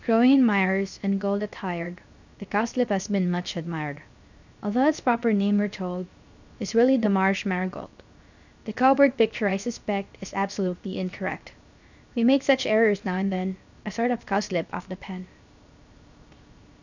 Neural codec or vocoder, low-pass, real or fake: codec, 16 kHz, 0.7 kbps, FocalCodec; 7.2 kHz; fake